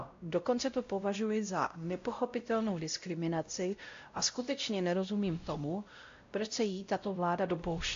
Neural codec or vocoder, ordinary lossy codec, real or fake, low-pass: codec, 16 kHz, 0.5 kbps, X-Codec, WavLM features, trained on Multilingual LibriSpeech; AAC, 48 kbps; fake; 7.2 kHz